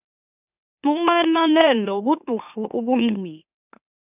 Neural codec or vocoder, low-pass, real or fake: autoencoder, 44.1 kHz, a latent of 192 numbers a frame, MeloTTS; 3.6 kHz; fake